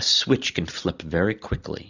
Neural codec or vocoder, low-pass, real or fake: none; 7.2 kHz; real